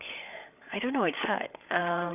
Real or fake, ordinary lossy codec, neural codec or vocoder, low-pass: fake; none; codec, 16 kHz, 8 kbps, FreqCodec, larger model; 3.6 kHz